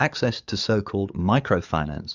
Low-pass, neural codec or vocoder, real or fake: 7.2 kHz; codec, 44.1 kHz, 7.8 kbps, DAC; fake